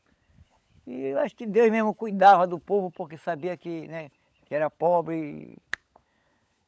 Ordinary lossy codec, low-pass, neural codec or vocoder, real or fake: none; none; codec, 16 kHz, 16 kbps, FunCodec, trained on LibriTTS, 50 frames a second; fake